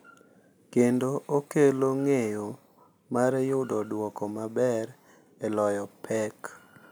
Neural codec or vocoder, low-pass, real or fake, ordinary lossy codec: none; none; real; none